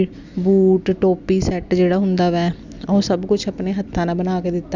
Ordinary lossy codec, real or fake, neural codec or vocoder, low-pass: none; real; none; 7.2 kHz